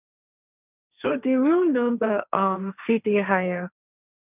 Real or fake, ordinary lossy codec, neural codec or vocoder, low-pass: fake; none; codec, 16 kHz, 1.1 kbps, Voila-Tokenizer; 3.6 kHz